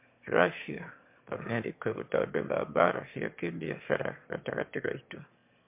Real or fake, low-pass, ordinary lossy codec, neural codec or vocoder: fake; 3.6 kHz; MP3, 32 kbps; autoencoder, 22.05 kHz, a latent of 192 numbers a frame, VITS, trained on one speaker